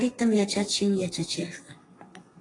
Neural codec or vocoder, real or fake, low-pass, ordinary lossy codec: codec, 32 kHz, 1.9 kbps, SNAC; fake; 10.8 kHz; AAC, 32 kbps